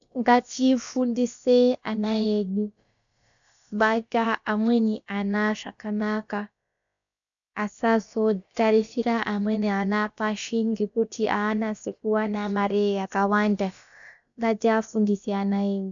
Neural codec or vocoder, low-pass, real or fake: codec, 16 kHz, about 1 kbps, DyCAST, with the encoder's durations; 7.2 kHz; fake